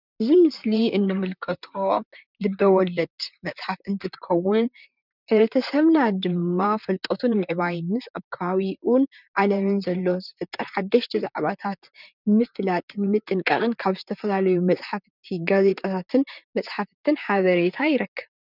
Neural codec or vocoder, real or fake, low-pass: vocoder, 44.1 kHz, 128 mel bands, Pupu-Vocoder; fake; 5.4 kHz